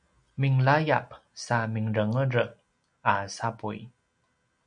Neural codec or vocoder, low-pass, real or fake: none; 9.9 kHz; real